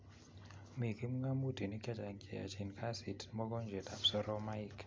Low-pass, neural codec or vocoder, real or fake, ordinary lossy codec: none; none; real; none